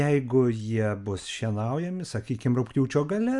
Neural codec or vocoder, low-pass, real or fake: none; 10.8 kHz; real